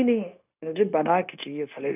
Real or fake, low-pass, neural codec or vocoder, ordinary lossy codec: fake; 3.6 kHz; codec, 16 kHz, 0.9 kbps, LongCat-Audio-Codec; none